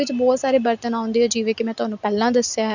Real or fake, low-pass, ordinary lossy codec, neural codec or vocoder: real; 7.2 kHz; none; none